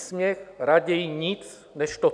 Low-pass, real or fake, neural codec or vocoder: 9.9 kHz; real; none